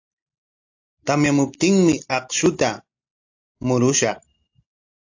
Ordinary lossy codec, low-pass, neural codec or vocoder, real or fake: AAC, 48 kbps; 7.2 kHz; none; real